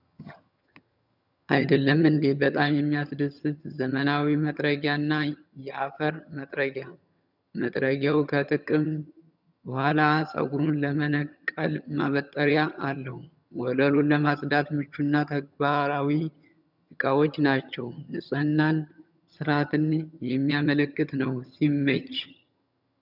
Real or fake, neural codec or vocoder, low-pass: fake; vocoder, 22.05 kHz, 80 mel bands, HiFi-GAN; 5.4 kHz